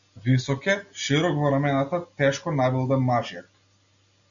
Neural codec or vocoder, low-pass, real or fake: none; 7.2 kHz; real